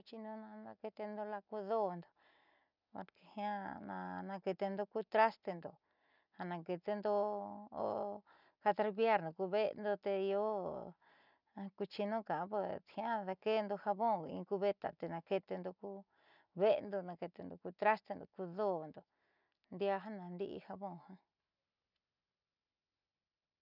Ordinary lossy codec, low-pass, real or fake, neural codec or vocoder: none; 5.4 kHz; real; none